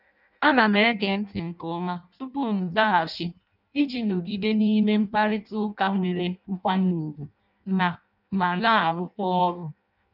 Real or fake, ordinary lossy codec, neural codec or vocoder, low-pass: fake; none; codec, 16 kHz in and 24 kHz out, 0.6 kbps, FireRedTTS-2 codec; 5.4 kHz